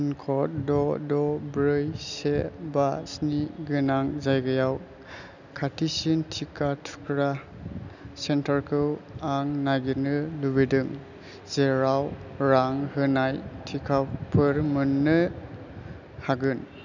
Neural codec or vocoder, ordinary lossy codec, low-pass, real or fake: none; none; 7.2 kHz; real